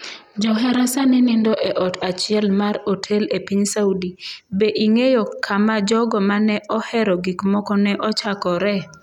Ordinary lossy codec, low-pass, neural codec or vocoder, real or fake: none; 19.8 kHz; none; real